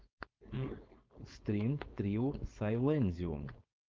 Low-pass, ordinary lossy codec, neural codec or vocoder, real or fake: 7.2 kHz; Opus, 24 kbps; codec, 16 kHz, 4.8 kbps, FACodec; fake